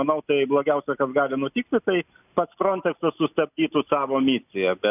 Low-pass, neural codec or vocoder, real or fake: 3.6 kHz; none; real